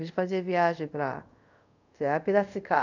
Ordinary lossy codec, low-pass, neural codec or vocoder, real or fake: none; 7.2 kHz; codec, 24 kHz, 0.5 kbps, DualCodec; fake